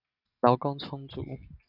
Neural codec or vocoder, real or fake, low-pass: none; real; 5.4 kHz